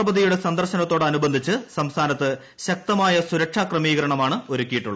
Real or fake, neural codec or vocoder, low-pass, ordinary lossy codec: real; none; none; none